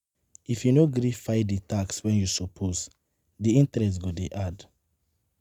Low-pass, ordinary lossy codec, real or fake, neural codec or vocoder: 19.8 kHz; none; real; none